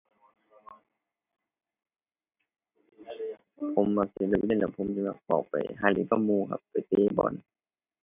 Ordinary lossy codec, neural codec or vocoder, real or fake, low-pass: none; none; real; 3.6 kHz